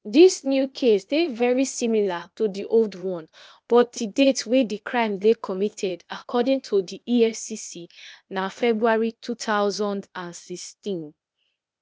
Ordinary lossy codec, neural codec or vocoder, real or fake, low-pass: none; codec, 16 kHz, 0.8 kbps, ZipCodec; fake; none